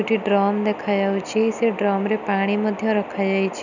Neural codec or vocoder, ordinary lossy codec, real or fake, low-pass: none; none; real; 7.2 kHz